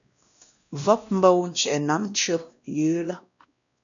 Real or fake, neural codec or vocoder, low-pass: fake; codec, 16 kHz, 1 kbps, X-Codec, WavLM features, trained on Multilingual LibriSpeech; 7.2 kHz